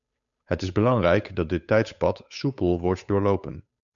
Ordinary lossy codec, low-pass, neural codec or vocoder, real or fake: MP3, 96 kbps; 7.2 kHz; codec, 16 kHz, 8 kbps, FunCodec, trained on Chinese and English, 25 frames a second; fake